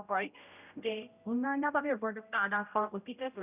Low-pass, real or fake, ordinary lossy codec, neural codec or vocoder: 3.6 kHz; fake; none; codec, 16 kHz, 0.5 kbps, X-Codec, HuBERT features, trained on general audio